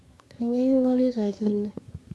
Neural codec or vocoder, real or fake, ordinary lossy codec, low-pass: codec, 24 kHz, 1 kbps, SNAC; fake; none; none